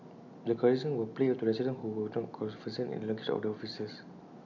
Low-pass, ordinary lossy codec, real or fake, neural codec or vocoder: 7.2 kHz; none; real; none